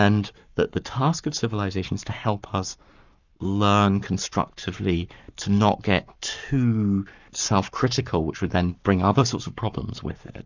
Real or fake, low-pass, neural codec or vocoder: fake; 7.2 kHz; codec, 44.1 kHz, 7.8 kbps, Pupu-Codec